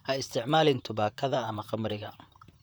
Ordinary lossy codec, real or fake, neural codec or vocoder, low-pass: none; fake; vocoder, 44.1 kHz, 128 mel bands, Pupu-Vocoder; none